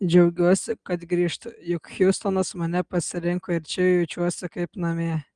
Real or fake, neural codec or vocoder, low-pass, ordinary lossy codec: real; none; 9.9 kHz; Opus, 24 kbps